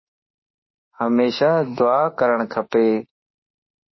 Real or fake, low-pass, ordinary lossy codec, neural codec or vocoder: fake; 7.2 kHz; MP3, 24 kbps; autoencoder, 48 kHz, 32 numbers a frame, DAC-VAE, trained on Japanese speech